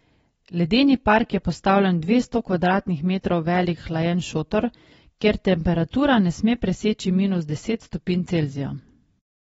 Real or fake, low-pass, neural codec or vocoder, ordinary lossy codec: real; 19.8 kHz; none; AAC, 24 kbps